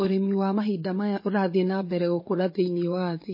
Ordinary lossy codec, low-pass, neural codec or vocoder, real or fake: MP3, 24 kbps; 5.4 kHz; vocoder, 24 kHz, 100 mel bands, Vocos; fake